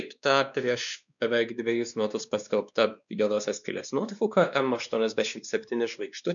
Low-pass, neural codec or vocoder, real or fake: 7.2 kHz; codec, 16 kHz, 2 kbps, X-Codec, WavLM features, trained on Multilingual LibriSpeech; fake